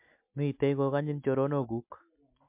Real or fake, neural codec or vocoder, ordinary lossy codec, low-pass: real; none; MP3, 32 kbps; 3.6 kHz